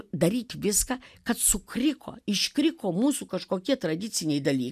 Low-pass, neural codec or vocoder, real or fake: 14.4 kHz; none; real